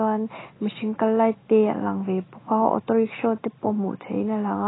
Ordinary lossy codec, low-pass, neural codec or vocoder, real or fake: AAC, 16 kbps; 7.2 kHz; none; real